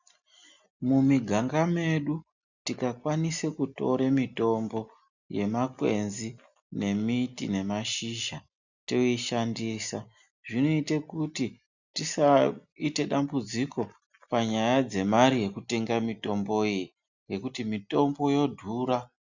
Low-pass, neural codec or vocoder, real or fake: 7.2 kHz; none; real